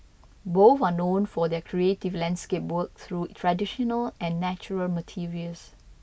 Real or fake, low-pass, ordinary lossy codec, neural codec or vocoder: real; none; none; none